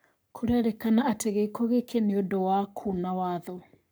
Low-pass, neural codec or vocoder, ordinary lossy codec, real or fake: none; codec, 44.1 kHz, 7.8 kbps, Pupu-Codec; none; fake